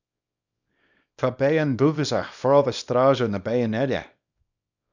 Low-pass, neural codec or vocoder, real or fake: 7.2 kHz; codec, 24 kHz, 0.9 kbps, WavTokenizer, small release; fake